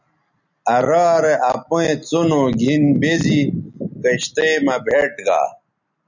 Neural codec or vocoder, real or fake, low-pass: none; real; 7.2 kHz